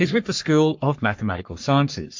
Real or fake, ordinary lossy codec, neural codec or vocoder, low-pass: fake; MP3, 48 kbps; codec, 44.1 kHz, 3.4 kbps, Pupu-Codec; 7.2 kHz